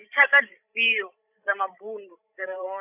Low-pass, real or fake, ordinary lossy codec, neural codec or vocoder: 3.6 kHz; fake; none; codec, 16 kHz, 16 kbps, FreqCodec, larger model